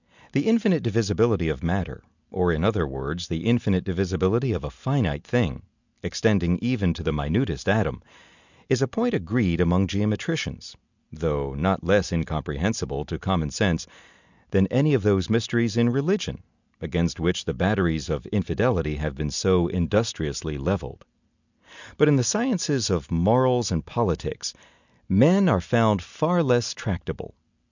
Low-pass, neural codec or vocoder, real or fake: 7.2 kHz; none; real